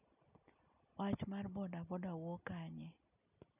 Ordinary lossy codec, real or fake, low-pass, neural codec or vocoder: none; real; 3.6 kHz; none